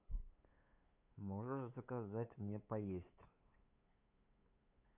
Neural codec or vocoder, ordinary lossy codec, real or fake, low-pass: codec, 16 kHz, 8 kbps, FunCodec, trained on LibriTTS, 25 frames a second; none; fake; 3.6 kHz